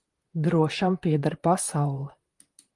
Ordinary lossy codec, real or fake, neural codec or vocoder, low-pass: Opus, 24 kbps; fake; vocoder, 44.1 kHz, 128 mel bands, Pupu-Vocoder; 10.8 kHz